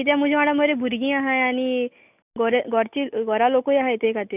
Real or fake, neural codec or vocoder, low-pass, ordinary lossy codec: real; none; 3.6 kHz; none